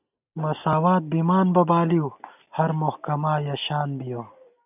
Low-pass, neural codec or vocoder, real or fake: 3.6 kHz; none; real